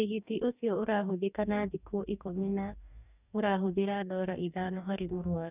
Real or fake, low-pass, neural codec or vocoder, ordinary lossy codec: fake; 3.6 kHz; codec, 44.1 kHz, 2.6 kbps, DAC; none